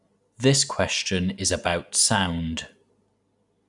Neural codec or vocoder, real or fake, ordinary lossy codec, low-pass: none; real; none; 10.8 kHz